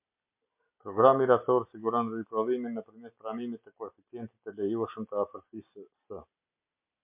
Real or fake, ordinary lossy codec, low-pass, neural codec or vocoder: real; MP3, 32 kbps; 3.6 kHz; none